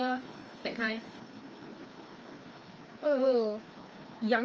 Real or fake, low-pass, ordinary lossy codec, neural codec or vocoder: fake; 7.2 kHz; Opus, 24 kbps; codec, 16 kHz, 2 kbps, FreqCodec, larger model